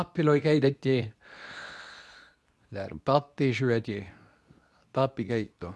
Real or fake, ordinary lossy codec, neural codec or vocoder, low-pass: fake; none; codec, 24 kHz, 0.9 kbps, WavTokenizer, medium speech release version 2; none